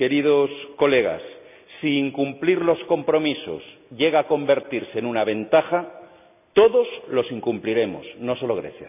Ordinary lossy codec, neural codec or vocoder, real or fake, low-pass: none; none; real; 3.6 kHz